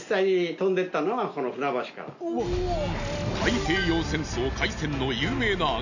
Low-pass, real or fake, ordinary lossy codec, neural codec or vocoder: 7.2 kHz; real; none; none